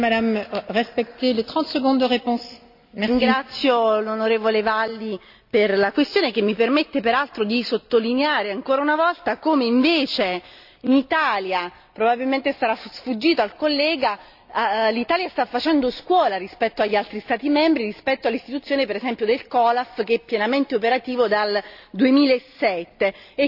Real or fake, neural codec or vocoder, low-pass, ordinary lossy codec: real; none; 5.4 kHz; AAC, 48 kbps